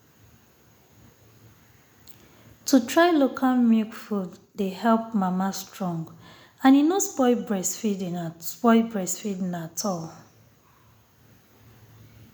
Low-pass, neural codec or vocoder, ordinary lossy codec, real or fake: none; none; none; real